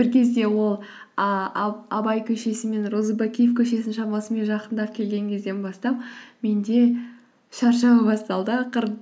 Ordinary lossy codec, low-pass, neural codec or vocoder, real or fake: none; none; none; real